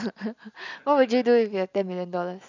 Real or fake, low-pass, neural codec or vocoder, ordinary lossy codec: real; 7.2 kHz; none; none